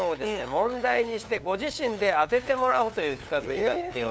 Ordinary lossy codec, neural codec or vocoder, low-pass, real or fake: none; codec, 16 kHz, 2 kbps, FunCodec, trained on LibriTTS, 25 frames a second; none; fake